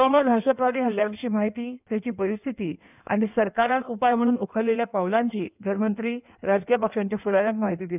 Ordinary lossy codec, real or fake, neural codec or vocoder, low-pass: none; fake; codec, 16 kHz in and 24 kHz out, 1.1 kbps, FireRedTTS-2 codec; 3.6 kHz